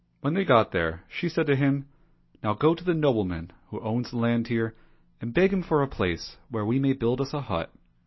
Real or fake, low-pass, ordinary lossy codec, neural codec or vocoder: real; 7.2 kHz; MP3, 24 kbps; none